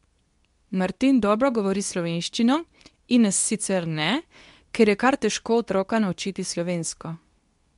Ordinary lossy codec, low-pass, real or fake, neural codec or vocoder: MP3, 64 kbps; 10.8 kHz; fake; codec, 24 kHz, 0.9 kbps, WavTokenizer, medium speech release version 2